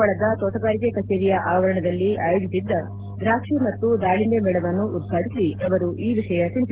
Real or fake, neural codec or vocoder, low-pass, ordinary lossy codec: real; none; 3.6 kHz; Opus, 16 kbps